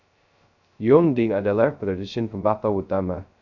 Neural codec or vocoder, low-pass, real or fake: codec, 16 kHz, 0.2 kbps, FocalCodec; 7.2 kHz; fake